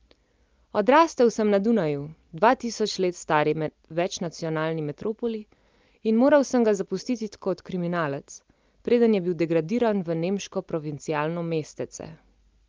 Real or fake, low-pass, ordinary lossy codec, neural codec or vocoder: real; 7.2 kHz; Opus, 24 kbps; none